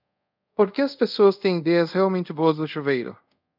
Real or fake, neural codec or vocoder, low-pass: fake; codec, 24 kHz, 0.5 kbps, DualCodec; 5.4 kHz